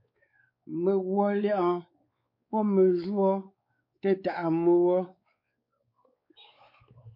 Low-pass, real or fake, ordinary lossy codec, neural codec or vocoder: 5.4 kHz; fake; MP3, 48 kbps; codec, 16 kHz, 4 kbps, X-Codec, WavLM features, trained on Multilingual LibriSpeech